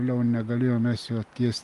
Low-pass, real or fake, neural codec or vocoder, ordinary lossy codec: 10.8 kHz; real; none; Opus, 32 kbps